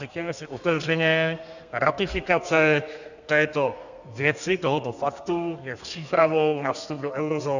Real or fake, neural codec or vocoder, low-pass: fake; codec, 32 kHz, 1.9 kbps, SNAC; 7.2 kHz